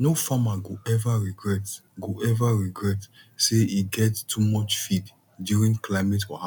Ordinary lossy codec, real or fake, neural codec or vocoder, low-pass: none; real; none; 19.8 kHz